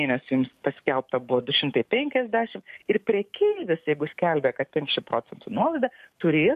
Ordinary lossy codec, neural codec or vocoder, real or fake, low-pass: MP3, 64 kbps; codec, 44.1 kHz, 7.8 kbps, DAC; fake; 14.4 kHz